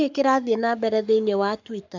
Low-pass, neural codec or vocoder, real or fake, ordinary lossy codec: 7.2 kHz; codec, 44.1 kHz, 7.8 kbps, Pupu-Codec; fake; none